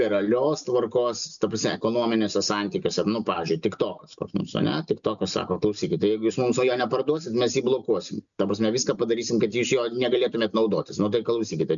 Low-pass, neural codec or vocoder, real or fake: 7.2 kHz; none; real